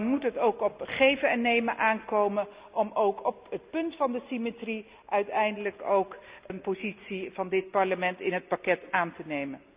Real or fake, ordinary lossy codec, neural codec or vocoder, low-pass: real; Opus, 64 kbps; none; 3.6 kHz